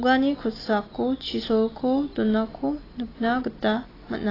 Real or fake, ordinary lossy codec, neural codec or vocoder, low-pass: real; AAC, 24 kbps; none; 5.4 kHz